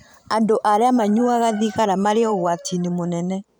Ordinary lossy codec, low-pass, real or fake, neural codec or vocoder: none; 19.8 kHz; fake; vocoder, 44.1 kHz, 128 mel bands every 512 samples, BigVGAN v2